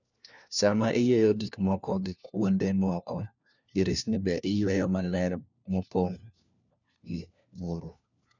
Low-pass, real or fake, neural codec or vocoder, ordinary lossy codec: 7.2 kHz; fake; codec, 16 kHz, 1 kbps, FunCodec, trained on LibriTTS, 50 frames a second; none